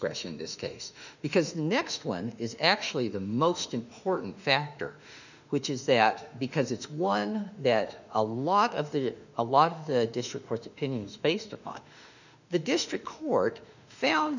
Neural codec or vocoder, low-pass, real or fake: autoencoder, 48 kHz, 32 numbers a frame, DAC-VAE, trained on Japanese speech; 7.2 kHz; fake